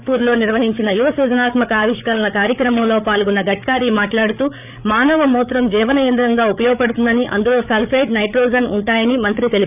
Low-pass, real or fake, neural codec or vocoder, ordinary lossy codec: 3.6 kHz; fake; codec, 16 kHz, 8 kbps, FreqCodec, larger model; none